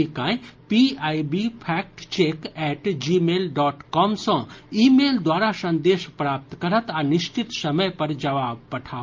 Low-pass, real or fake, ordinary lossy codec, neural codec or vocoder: 7.2 kHz; real; Opus, 24 kbps; none